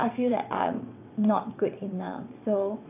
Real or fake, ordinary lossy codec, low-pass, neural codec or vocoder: fake; AAC, 24 kbps; 3.6 kHz; vocoder, 22.05 kHz, 80 mel bands, WaveNeXt